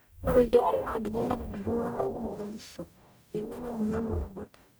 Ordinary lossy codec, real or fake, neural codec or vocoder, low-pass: none; fake; codec, 44.1 kHz, 0.9 kbps, DAC; none